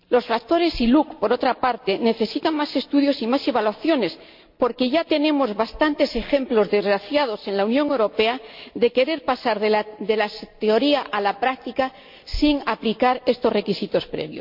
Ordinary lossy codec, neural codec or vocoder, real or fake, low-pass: MP3, 48 kbps; none; real; 5.4 kHz